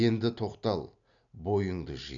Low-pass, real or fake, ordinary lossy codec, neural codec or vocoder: 7.2 kHz; real; none; none